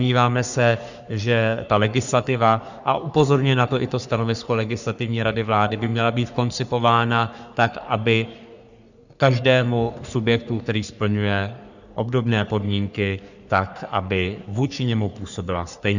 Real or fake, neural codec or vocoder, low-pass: fake; codec, 44.1 kHz, 3.4 kbps, Pupu-Codec; 7.2 kHz